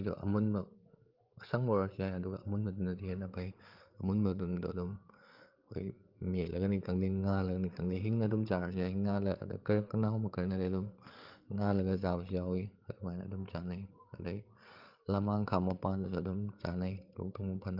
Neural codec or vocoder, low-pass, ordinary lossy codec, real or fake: codec, 16 kHz, 8 kbps, FreqCodec, larger model; 5.4 kHz; Opus, 32 kbps; fake